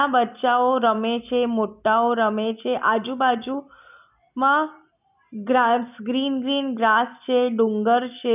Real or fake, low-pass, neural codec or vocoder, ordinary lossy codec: real; 3.6 kHz; none; none